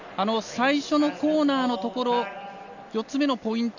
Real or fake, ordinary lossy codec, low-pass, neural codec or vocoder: real; none; 7.2 kHz; none